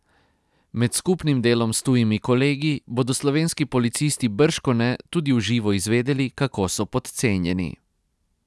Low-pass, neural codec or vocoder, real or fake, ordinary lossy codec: none; none; real; none